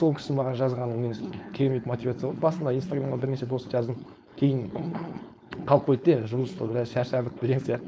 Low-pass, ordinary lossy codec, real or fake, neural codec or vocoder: none; none; fake; codec, 16 kHz, 4.8 kbps, FACodec